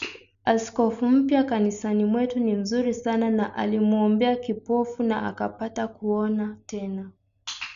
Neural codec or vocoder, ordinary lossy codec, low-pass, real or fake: none; none; 7.2 kHz; real